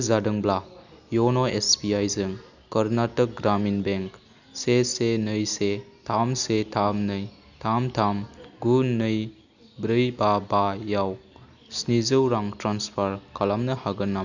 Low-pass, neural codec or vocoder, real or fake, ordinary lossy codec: 7.2 kHz; none; real; none